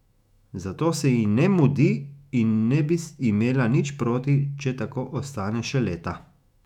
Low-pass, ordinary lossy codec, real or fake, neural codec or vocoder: 19.8 kHz; none; fake; autoencoder, 48 kHz, 128 numbers a frame, DAC-VAE, trained on Japanese speech